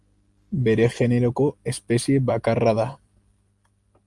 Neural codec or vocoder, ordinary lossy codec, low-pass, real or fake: none; Opus, 32 kbps; 10.8 kHz; real